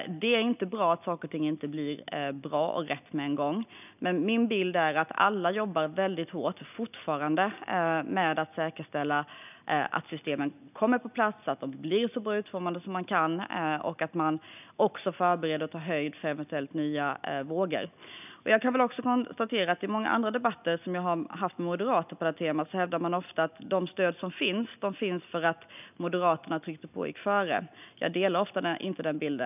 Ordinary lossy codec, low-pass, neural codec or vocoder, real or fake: none; 3.6 kHz; none; real